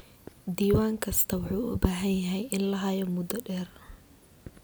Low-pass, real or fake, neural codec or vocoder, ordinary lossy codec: none; real; none; none